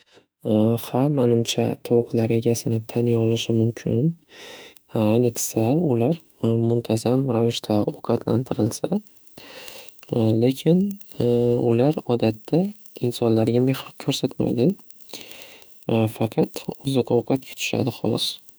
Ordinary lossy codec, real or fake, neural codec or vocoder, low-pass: none; fake; autoencoder, 48 kHz, 32 numbers a frame, DAC-VAE, trained on Japanese speech; none